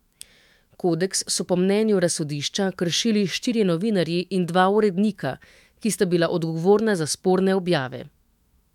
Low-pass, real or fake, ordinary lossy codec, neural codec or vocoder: 19.8 kHz; fake; MP3, 96 kbps; autoencoder, 48 kHz, 128 numbers a frame, DAC-VAE, trained on Japanese speech